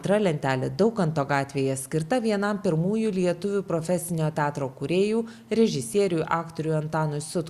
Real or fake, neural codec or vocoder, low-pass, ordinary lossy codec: real; none; 14.4 kHz; Opus, 64 kbps